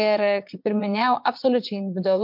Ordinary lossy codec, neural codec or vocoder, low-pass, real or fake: MP3, 48 kbps; vocoder, 22.05 kHz, 80 mel bands, Vocos; 5.4 kHz; fake